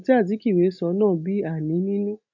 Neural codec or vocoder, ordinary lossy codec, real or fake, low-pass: none; none; real; 7.2 kHz